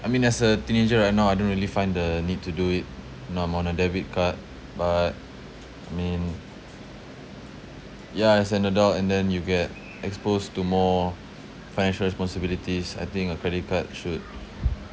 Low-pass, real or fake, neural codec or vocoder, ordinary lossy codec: none; real; none; none